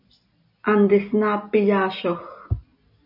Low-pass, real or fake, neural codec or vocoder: 5.4 kHz; real; none